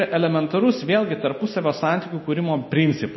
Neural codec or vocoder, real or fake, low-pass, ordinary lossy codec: none; real; 7.2 kHz; MP3, 24 kbps